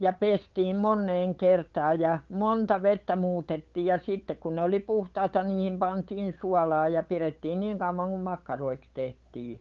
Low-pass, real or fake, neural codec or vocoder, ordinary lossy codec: 7.2 kHz; fake; codec, 16 kHz, 8 kbps, FunCodec, trained on LibriTTS, 25 frames a second; Opus, 24 kbps